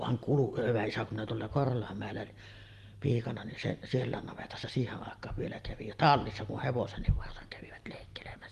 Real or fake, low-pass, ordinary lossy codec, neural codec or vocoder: real; 14.4 kHz; Opus, 24 kbps; none